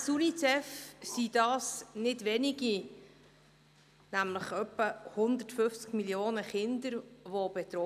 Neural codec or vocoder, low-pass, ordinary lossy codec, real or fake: none; 14.4 kHz; none; real